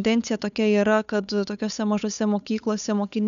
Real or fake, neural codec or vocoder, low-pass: fake; codec, 16 kHz, 8 kbps, FunCodec, trained on Chinese and English, 25 frames a second; 7.2 kHz